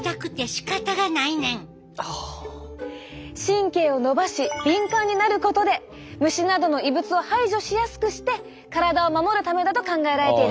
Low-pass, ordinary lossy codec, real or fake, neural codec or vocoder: none; none; real; none